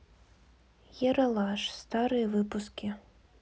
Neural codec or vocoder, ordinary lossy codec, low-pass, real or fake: none; none; none; real